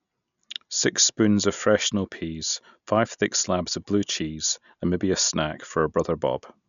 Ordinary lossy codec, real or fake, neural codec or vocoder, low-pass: none; real; none; 7.2 kHz